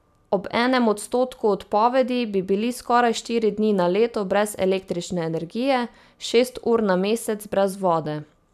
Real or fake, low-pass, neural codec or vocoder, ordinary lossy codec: real; 14.4 kHz; none; none